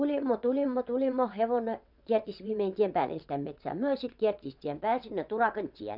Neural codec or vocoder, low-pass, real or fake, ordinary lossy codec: vocoder, 22.05 kHz, 80 mel bands, WaveNeXt; 5.4 kHz; fake; none